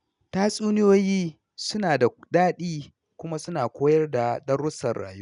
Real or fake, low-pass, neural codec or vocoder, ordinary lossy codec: real; 10.8 kHz; none; none